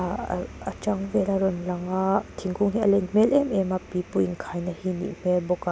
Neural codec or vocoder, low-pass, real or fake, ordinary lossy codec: none; none; real; none